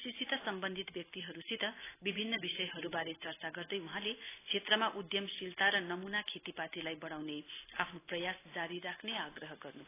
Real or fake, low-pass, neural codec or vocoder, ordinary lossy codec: real; 3.6 kHz; none; AAC, 16 kbps